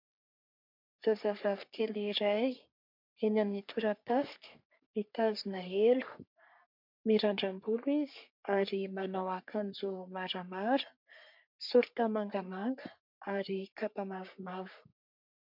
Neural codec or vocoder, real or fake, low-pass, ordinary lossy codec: codec, 44.1 kHz, 3.4 kbps, Pupu-Codec; fake; 5.4 kHz; MP3, 48 kbps